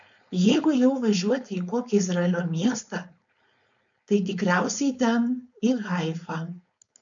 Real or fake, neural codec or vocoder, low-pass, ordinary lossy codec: fake; codec, 16 kHz, 4.8 kbps, FACodec; 7.2 kHz; MP3, 96 kbps